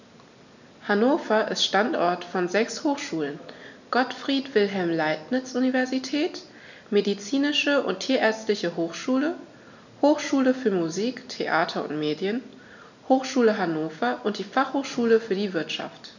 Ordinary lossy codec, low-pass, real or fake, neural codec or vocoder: none; 7.2 kHz; real; none